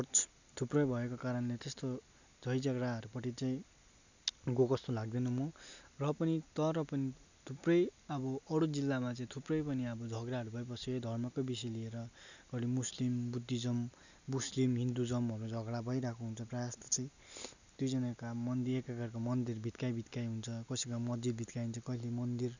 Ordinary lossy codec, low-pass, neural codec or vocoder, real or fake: none; 7.2 kHz; none; real